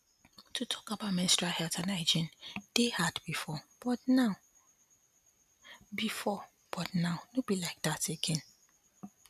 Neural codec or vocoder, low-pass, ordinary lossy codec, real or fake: none; 14.4 kHz; none; real